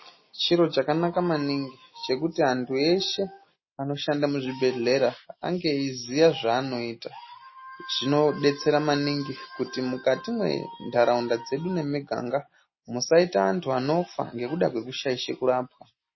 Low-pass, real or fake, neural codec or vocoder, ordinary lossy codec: 7.2 kHz; real; none; MP3, 24 kbps